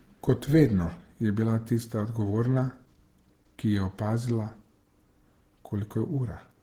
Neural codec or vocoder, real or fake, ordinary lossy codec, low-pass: vocoder, 48 kHz, 128 mel bands, Vocos; fake; Opus, 16 kbps; 19.8 kHz